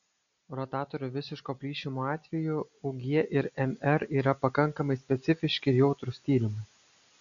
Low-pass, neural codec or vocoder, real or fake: 7.2 kHz; none; real